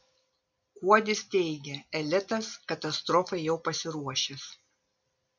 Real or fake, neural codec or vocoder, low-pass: real; none; 7.2 kHz